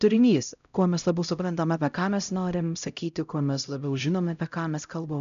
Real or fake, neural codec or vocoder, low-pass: fake; codec, 16 kHz, 0.5 kbps, X-Codec, HuBERT features, trained on LibriSpeech; 7.2 kHz